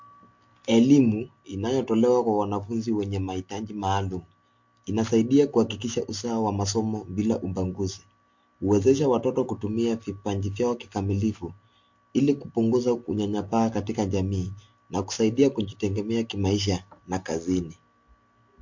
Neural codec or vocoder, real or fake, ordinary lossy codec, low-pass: none; real; MP3, 48 kbps; 7.2 kHz